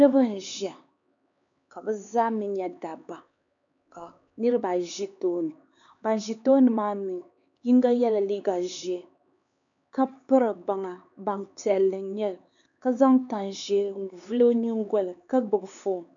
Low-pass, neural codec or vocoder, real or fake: 7.2 kHz; codec, 16 kHz, 4 kbps, X-Codec, HuBERT features, trained on LibriSpeech; fake